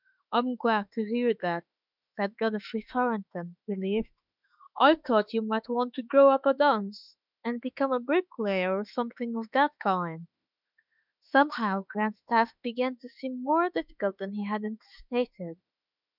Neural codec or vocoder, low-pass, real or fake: autoencoder, 48 kHz, 32 numbers a frame, DAC-VAE, trained on Japanese speech; 5.4 kHz; fake